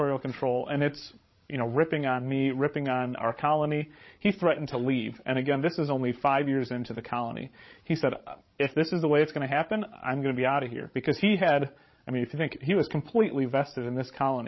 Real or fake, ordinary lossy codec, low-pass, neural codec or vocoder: fake; MP3, 24 kbps; 7.2 kHz; codec, 16 kHz, 16 kbps, FreqCodec, larger model